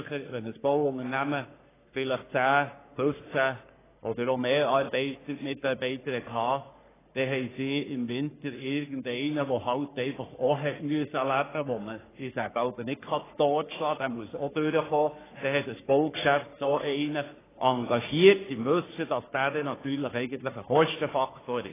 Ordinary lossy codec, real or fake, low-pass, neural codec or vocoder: AAC, 16 kbps; fake; 3.6 kHz; codec, 44.1 kHz, 3.4 kbps, Pupu-Codec